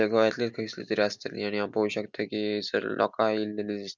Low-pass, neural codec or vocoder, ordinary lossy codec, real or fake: 7.2 kHz; none; none; real